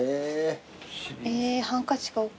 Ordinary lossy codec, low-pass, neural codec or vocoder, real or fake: none; none; none; real